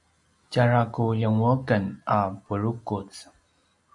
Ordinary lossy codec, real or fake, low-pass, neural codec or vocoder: MP3, 48 kbps; real; 10.8 kHz; none